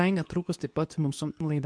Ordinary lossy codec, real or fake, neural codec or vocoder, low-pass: MP3, 64 kbps; fake; codec, 24 kHz, 0.9 kbps, WavTokenizer, medium speech release version 2; 9.9 kHz